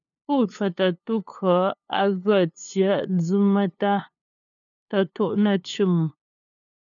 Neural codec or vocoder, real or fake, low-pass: codec, 16 kHz, 2 kbps, FunCodec, trained on LibriTTS, 25 frames a second; fake; 7.2 kHz